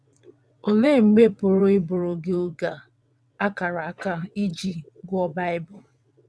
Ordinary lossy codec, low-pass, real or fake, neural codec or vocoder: none; none; fake; vocoder, 22.05 kHz, 80 mel bands, WaveNeXt